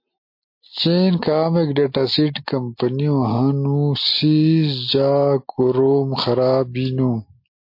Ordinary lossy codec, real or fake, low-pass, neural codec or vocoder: MP3, 32 kbps; real; 5.4 kHz; none